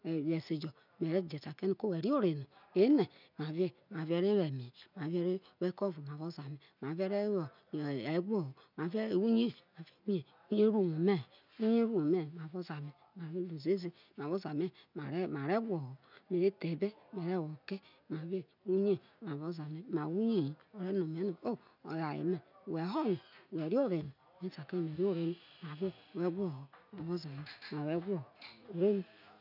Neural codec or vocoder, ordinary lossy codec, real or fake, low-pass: none; none; real; 5.4 kHz